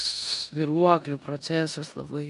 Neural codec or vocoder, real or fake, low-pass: codec, 16 kHz in and 24 kHz out, 0.9 kbps, LongCat-Audio-Codec, four codebook decoder; fake; 10.8 kHz